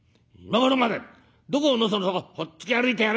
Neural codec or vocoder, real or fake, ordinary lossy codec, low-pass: none; real; none; none